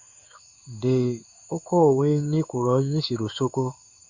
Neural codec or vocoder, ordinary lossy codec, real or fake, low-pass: codec, 16 kHz in and 24 kHz out, 1 kbps, XY-Tokenizer; Opus, 64 kbps; fake; 7.2 kHz